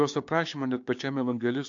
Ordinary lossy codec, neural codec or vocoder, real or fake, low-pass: AAC, 48 kbps; codec, 16 kHz, 6 kbps, DAC; fake; 7.2 kHz